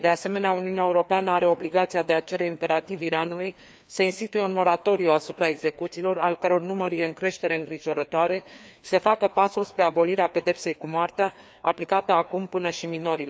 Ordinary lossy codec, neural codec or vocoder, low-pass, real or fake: none; codec, 16 kHz, 2 kbps, FreqCodec, larger model; none; fake